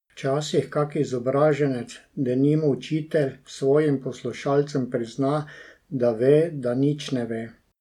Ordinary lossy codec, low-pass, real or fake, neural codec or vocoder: none; 19.8 kHz; real; none